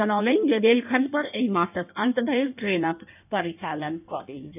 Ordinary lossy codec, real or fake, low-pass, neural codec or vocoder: none; fake; 3.6 kHz; codec, 16 kHz in and 24 kHz out, 1.1 kbps, FireRedTTS-2 codec